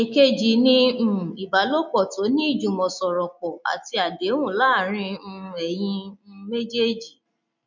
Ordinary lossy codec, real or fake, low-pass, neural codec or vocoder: none; real; 7.2 kHz; none